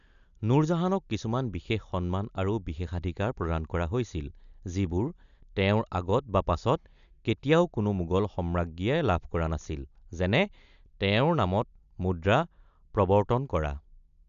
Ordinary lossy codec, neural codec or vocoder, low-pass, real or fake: none; none; 7.2 kHz; real